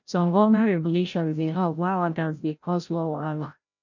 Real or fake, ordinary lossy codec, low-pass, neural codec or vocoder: fake; none; 7.2 kHz; codec, 16 kHz, 0.5 kbps, FreqCodec, larger model